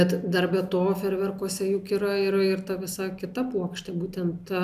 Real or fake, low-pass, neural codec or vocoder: real; 14.4 kHz; none